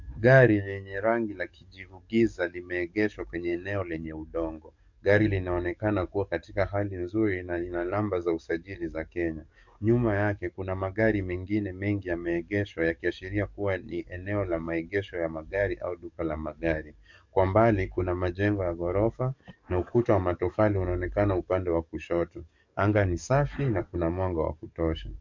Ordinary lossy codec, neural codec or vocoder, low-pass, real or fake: MP3, 64 kbps; codec, 44.1 kHz, 7.8 kbps, DAC; 7.2 kHz; fake